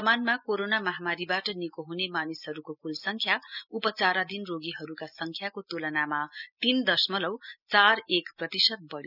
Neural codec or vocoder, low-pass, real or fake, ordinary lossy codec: none; 5.4 kHz; real; none